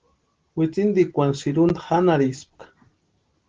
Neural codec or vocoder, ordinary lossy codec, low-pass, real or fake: none; Opus, 16 kbps; 7.2 kHz; real